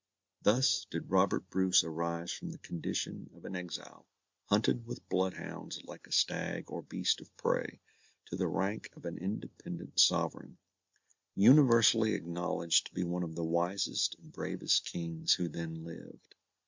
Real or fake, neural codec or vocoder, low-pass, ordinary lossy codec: real; none; 7.2 kHz; MP3, 64 kbps